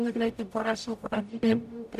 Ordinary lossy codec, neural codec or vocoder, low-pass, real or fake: none; codec, 44.1 kHz, 0.9 kbps, DAC; 14.4 kHz; fake